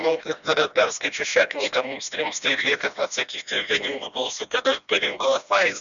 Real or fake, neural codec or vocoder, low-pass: fake; codec, 16 kHz, 1 kbps, FreqCodec, smaller model; 7.2 kHz